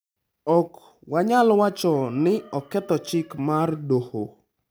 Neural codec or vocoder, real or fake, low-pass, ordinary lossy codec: none; real; none; none